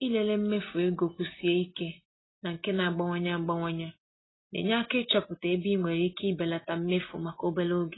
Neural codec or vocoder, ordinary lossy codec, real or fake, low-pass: none; AAC, 16 kbps; real; 7.2 kHz